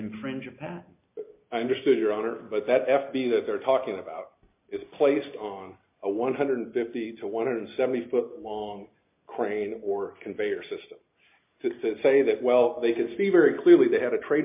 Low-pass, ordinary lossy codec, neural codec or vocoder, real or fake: 3.6 kHz; MP3, 32 kbps; none; real